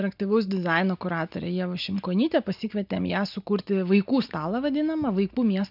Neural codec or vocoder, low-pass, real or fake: none; 5.4 kHz; real